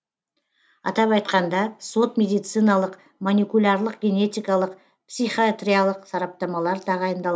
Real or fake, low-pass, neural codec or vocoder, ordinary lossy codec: real; none; none; none